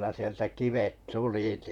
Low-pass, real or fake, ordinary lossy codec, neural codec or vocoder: 19.8 kHz; fake; none; vocoder, 44.1 kHz, 128 mel bands, Pupu-Vocoder